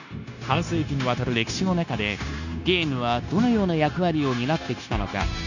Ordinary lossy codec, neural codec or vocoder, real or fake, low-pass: none; codec, 16 kHz, 0.9 kbps, LongCat-Audio-Codec; fake; 7.2 kHz